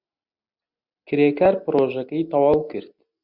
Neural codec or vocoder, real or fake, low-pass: none; real; 5.4 kHz